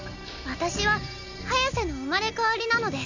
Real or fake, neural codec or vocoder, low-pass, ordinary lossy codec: real; none; 7.2 kHz; none